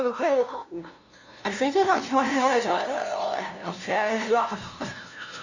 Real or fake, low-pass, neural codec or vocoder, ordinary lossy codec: fake; 7.2 kHz; codec, 16 kHz, 0.5 kbps, FunCodec, trained on LibriTTS, 25 frames a second; Opus, 64 kbps